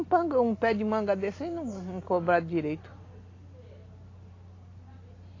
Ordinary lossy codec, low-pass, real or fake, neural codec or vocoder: AAC, 32 kbps; 7.2 kHz; real; none